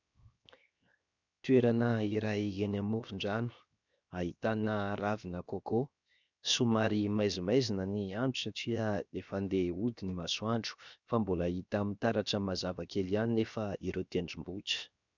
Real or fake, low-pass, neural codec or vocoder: fake; 7.2 kHz; codec, 16 kHz, 0.7 kbps, FocalCodec